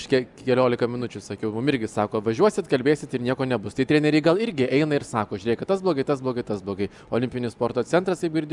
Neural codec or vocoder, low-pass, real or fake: none; 10.8 kHz; real